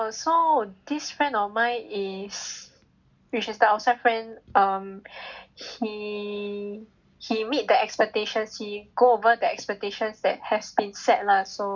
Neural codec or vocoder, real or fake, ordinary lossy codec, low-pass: none; real; none; 7.2 kHz